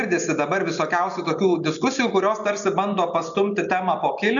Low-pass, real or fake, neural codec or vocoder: 7.2 kHz; real; none